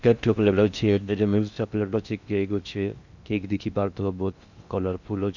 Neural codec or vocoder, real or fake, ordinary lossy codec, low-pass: codec, 16 kHz in and 24 kHz out, 0.6 kbps, FocalCodec, streaming, 4096 codes; fake; none; 7.2 kHz